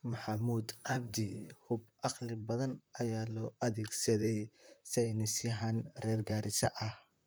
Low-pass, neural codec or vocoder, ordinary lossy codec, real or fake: none; vocoder, 44.1 kHz, 128 mel bands, Pupu-Vocoder; none; fake